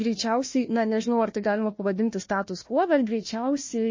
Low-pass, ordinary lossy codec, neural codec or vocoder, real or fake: 7.2 kHz; MP3, 32 kbps; codec, 16 kHz, 1 kbps, FunCodec, trained on Chinese and English, 50 frames a second; fake